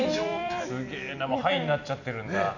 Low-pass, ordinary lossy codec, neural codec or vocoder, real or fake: 7.2 kHz; none; vocoder, 44.1 kHz, 128 mel bands every 256 samples, BigVGAN v2; fake